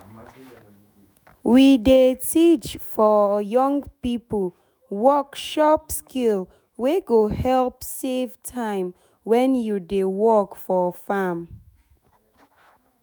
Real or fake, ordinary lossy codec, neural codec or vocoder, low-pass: fake; none; autoencoder, 48 kHz, 128 numbers a frame, DAC-VAE, trained on Japanese speech; none